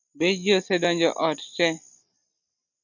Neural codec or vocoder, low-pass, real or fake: none; 7.2 kHz; real